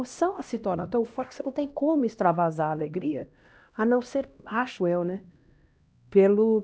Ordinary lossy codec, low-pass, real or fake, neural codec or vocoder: none; none; fake; codec, 16 kHz, 1 kbps, X-Codec, HuBERT features, trained on LibriSpeech